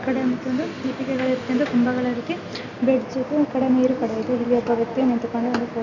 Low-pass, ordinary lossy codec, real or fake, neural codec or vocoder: 7.2 kHz; none; real; none